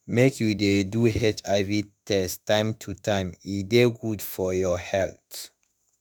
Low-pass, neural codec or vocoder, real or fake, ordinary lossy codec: none; autoencoder, 48 kHz, 32 numbers a frame, DAC-VAE, trained on Japanese speech; fake; none